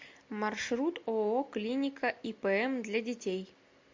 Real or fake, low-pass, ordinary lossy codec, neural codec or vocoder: real; 7.2 kHz; MP3, 48 kbps; none